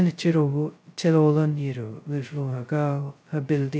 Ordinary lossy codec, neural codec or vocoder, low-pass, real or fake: none; codec, 16 kHz, 0.2 kbps, FocalCodec; none; fake